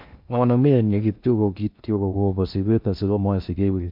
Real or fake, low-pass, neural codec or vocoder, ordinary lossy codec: fake; 5.4 kHz; codec, 16 kHz in and 24 kHz out, 0.6 kbps, FocalCodec, streaming, 2048 codes; MP3, 48 kbps